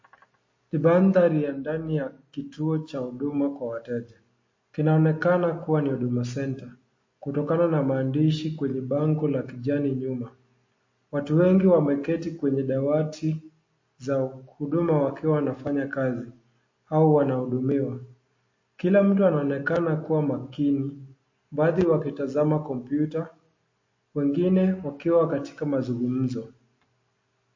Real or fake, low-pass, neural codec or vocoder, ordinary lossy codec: real; 7.2 kHz; none; MP3, 32 kbps